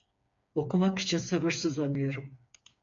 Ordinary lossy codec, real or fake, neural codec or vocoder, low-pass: MP3, 48 kbps; fake; codec, 16 kHz, 4 kbps, FreqCodec, smaller model; 7.2 kHz